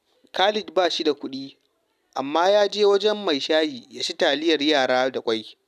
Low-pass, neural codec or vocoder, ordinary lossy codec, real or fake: 14.4 kHz; none; none; real